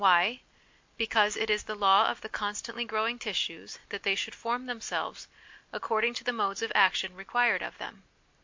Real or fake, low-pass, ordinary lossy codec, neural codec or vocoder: real; 7.2 kHz; MP3, 48 kbps; none